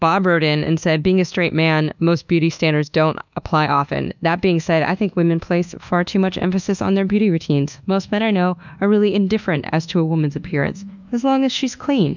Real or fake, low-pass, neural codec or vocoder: fake; 7.2 kHz; codec, 24 kHz, 1.2 kbps, DualCodec